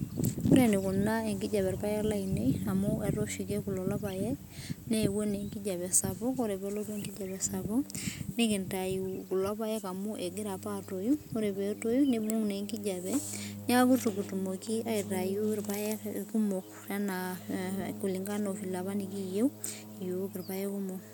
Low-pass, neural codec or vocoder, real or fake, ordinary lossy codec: none; none; real; none